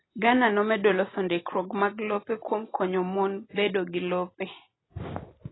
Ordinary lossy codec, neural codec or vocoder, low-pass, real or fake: AAC, 16 kbps; none; 7.2 kHz; real